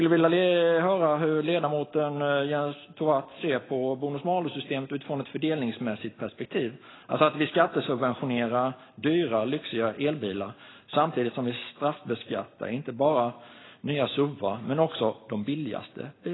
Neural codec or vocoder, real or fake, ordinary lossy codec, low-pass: none; real; AAC, 16 kbps; 7.2 kHz